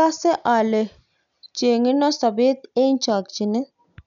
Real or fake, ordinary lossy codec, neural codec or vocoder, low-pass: real; none; none; 7.2 kHz